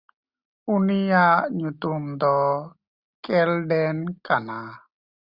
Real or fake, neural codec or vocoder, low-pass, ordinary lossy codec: real; none; 5.4 kHz; Opus, 64 kbps